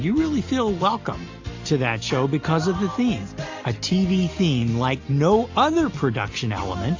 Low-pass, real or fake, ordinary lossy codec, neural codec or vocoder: 7.2 kHz; real; AAC, 48 kbps; none